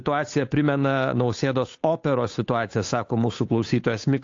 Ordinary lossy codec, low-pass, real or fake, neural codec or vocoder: AAC, 48 kbps; 7.2 kHz; fake; codec, 16 kHz, 8 kbps, FunCodec, trained on Chinese and English, 25 frames a second